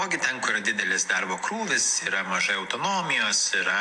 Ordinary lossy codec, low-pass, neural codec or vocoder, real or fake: AAC, 64 kbps; 10.8 kHz; none; real